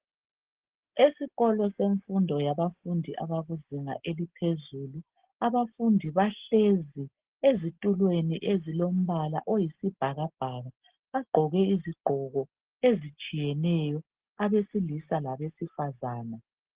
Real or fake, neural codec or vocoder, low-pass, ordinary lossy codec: real; none; 3.6 kHz; Opus, 16 kbps